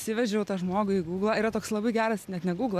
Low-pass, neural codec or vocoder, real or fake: 14.4 kHz; none; real